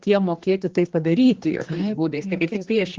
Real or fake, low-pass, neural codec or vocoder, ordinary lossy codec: fake; 7.2 kHz; codec, 16 kHz, 2 kbps, X-Codec, HuBERT features, trained on general audio; Opus, 16 kbps